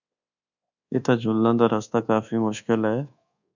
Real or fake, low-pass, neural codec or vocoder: fake; 7.2 kHz; codec, 24 kHz, 1.2 kbps, DualCodec